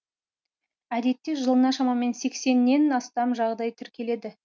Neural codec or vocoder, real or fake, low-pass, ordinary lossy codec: none; real; none; none